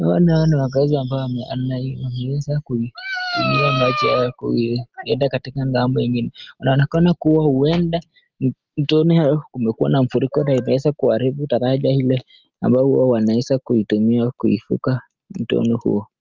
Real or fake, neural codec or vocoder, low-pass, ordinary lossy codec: real; none; 7.2 kHz; Opus, 32 kbps